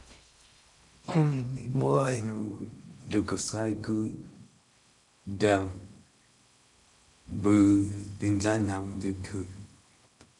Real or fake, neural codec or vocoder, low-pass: fake; codec, 16 kHz in and 24 kHz out, 0.6 kbps, FocalCodec, streaming, 2048 codes; 10.8 kHz